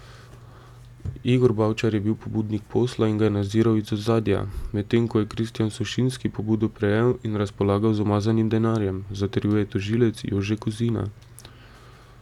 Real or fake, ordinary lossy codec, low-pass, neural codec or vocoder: real; none; 19.8 kHz; none